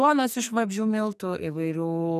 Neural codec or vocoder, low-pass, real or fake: codec, 44.1 kHz, 2.6 kbps, SNAC; 14.4 kHz; fake